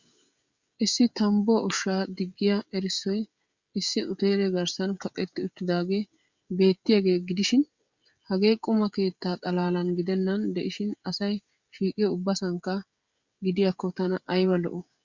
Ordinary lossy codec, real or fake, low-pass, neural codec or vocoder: Opus, 64 kbps; fake; 7.2 kHz; codec, 16 kHz, 16 kbps, FreqCodec, smaller model